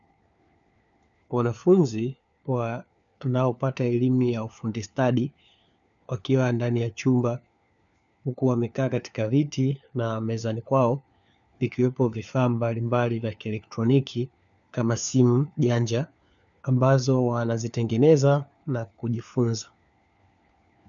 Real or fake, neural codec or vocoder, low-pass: fake; codec, 16 kHz, 4 kbps, FunCodec, trained on LibriTTS, 50 frames a second; 7.2 kHz